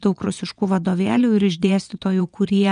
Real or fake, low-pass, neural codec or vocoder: fake; 9.9 kHz; vocoder, 22.05 kHz, 80 mel bands, Vocos